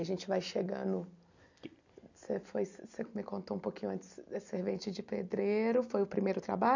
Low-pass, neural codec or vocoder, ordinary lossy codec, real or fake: 7.2 kHz; none; none; real